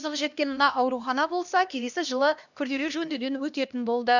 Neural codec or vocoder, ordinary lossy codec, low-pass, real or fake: codec, 16 kHz, 1 kbps, X-Codec, HuBERT features, trained on LibriSpeech; none; 7.2 kHz; fake